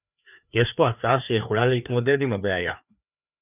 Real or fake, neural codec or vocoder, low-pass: fake; codec, 16 kHz, 2 kbps, FreqCodec, larger model; 3.6 kHz